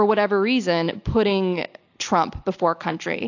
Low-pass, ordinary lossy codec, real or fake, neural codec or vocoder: 7.2 kHz; AAC, 48 kbps; real; none